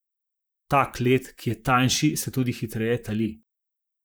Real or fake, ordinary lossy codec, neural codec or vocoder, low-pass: fake; none; vocoder, 44.1 kHz, 128 mel bands, Pupu-Vocoder; none